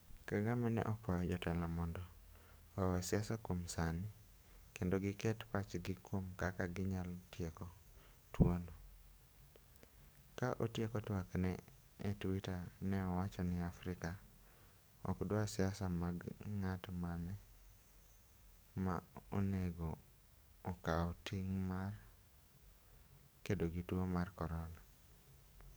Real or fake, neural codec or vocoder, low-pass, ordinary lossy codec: fake; codec, 44.1 kHz, 7.8 kbps, DAC; none; none